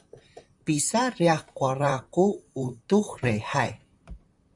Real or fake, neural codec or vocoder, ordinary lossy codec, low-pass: fake; vocoder, 44.1 kHz, 128 mel bands, Pupu-Vocoder; MP3, 96 kbps; 10.8 kHz